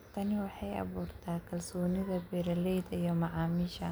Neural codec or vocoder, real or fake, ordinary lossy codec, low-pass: none; real; none; none